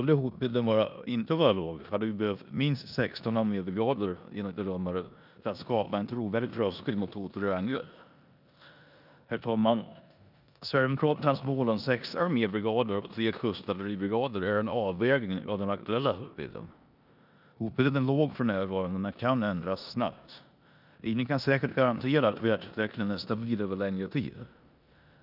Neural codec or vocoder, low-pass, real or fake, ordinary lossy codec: codec, 16 kHz in and 24 kHz out, 0.9 kbps, LongCat-Audio-Codec, four codebook decoder; 5.4 kHz; fake; none